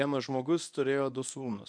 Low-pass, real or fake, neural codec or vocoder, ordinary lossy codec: 9.9 kHz; fake; codec, 24 kHz, 0.9 kbps, WavTokenizer, medium speech release version 1; MP3, 96 kbps